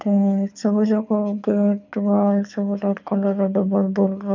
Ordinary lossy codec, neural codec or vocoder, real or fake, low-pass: MP3, 64 kbps; codec, 24 kHz, 6 kbps, HILCodec; fake; 7.2 kHz